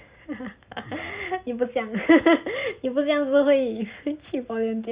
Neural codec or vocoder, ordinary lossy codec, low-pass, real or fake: none; Opus, 64 kbps; 3.6 kHz; real